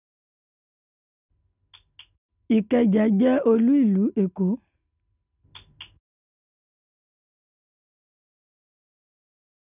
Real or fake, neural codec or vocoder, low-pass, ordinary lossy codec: real; none; 3.6 kHz; none